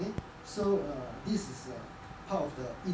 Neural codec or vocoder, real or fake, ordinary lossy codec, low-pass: none; real; none; none